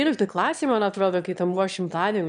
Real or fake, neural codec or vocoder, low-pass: fake; autoencoder, 22.05 kHz, a latent of 192 numbers a frame, VITS, trained on one speaker; 9.9 kHz